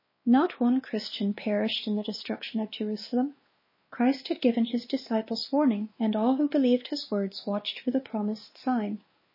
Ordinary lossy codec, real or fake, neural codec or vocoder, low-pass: MP3, 24 kbps; fake; codec, 16 kHz, 2 kbps, X-Codec, WavLM features, trained on Multilingual LibriSpeech; 5.4 kHz